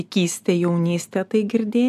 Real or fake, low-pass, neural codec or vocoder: real; 14.4 kHz; none